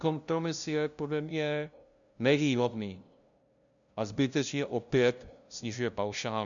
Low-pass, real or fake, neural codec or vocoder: 7.2 kHz; fake; codec, 16 kHz, 0.5 kbps, FunCodec, trained on LibriTTS, 25 frames a second